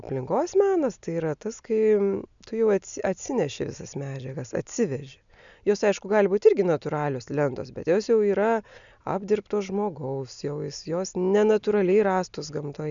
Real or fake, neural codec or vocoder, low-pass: real; none; 7.2 kHz